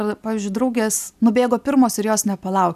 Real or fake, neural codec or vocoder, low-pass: real; none; 14.4 kHz